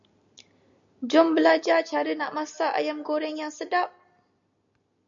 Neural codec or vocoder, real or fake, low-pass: none; real; 7.2 kHz